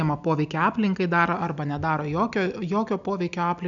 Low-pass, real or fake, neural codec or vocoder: 7.2 kHz; real; none